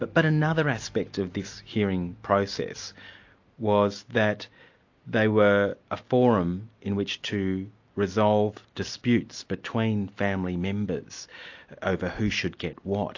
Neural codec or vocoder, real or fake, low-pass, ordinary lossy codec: none; real; 7.2 kHz; AAC, 48 kbps